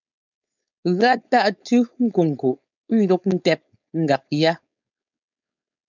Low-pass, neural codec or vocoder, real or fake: 7.2 kHz; codec, 16 kHz, 4.8 kbps, FACodec; fake